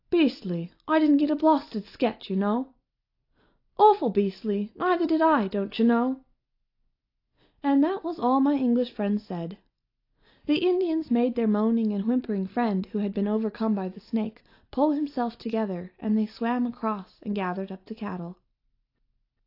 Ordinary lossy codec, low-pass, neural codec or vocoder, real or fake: AAC, 32 kbps; 5.4 kHz; none; real